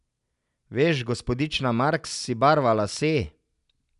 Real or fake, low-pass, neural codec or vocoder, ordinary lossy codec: real; 10.8 kHz; none; none